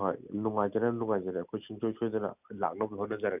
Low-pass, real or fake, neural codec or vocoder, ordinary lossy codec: 3.6 kHz; real; none; none